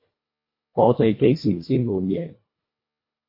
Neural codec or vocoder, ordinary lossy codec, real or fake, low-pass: codec, 24 kHz, 1.5 kbps, HILCodec; MP3, 32 kbps; fake; 5.4 kHz